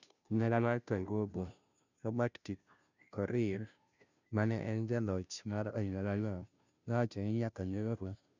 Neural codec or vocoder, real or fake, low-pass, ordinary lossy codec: codec, 16 kHz, 0.5 kbps, FunCodec, trained on Chinese and English, 25 frames a second; fake; 7.2 kHz; none